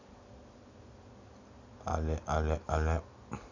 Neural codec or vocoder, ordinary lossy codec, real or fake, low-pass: none; none; real; 7.2 kHz